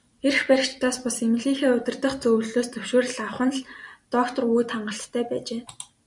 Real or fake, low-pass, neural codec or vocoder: fake; 10.8 kHz; vocoder, 44.1 kHz, 128 mel bands every 256 samples, BigVGAN v2